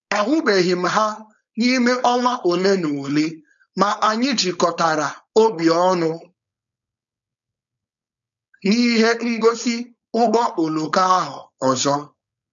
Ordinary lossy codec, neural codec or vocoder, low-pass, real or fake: none; codec, 16 kHz, 4.8 kbps, FACodec; 7.2 kHz; fake